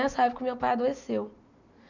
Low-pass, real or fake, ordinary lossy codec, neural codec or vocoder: 7.2 kHz; real; none; none